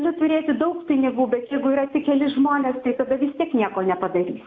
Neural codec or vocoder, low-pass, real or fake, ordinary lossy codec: none; 7.2 kHz; real; AAC, 48 kbps